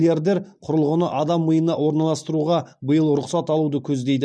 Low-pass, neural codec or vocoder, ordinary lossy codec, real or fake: none; none; none; real